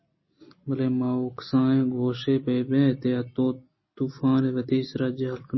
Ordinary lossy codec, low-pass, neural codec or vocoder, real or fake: MP3, 24 kbps; 7.2 kHz; none; real